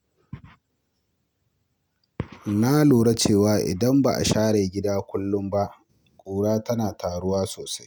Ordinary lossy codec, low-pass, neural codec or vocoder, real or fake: none; none; none; real